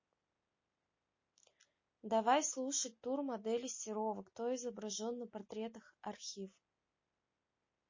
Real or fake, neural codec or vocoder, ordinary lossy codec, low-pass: real; none; MP3, 32 kbps; 7.2 kHz